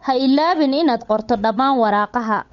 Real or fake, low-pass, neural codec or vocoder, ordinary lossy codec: fake; 7.2 kHz; codec, 16 kHz, 8 kbps, FunCodec, trained on Chinese and English, 25 frames a second; MP3, 48 kbps